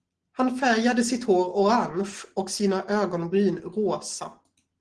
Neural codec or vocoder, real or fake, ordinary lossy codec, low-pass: none; real; Opus, 16 kbps; 9.9 kHz